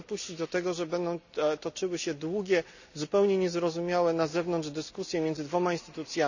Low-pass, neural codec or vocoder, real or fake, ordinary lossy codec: 7.2 kHz; none; real; none